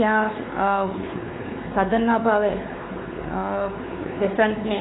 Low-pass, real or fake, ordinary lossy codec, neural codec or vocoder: 7.2 kHz; fake; AAC, 16 kbps; codec, 16 kHz, 4 kbps, X-Codec, WavLM features, trained on Multilingual LibriSpeech